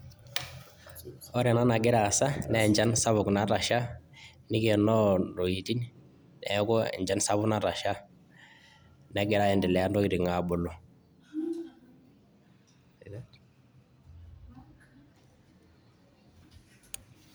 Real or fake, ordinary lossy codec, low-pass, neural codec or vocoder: real; none; none; none